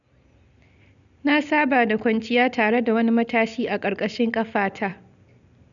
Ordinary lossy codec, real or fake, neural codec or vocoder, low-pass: none; real; none; 7.2 kHz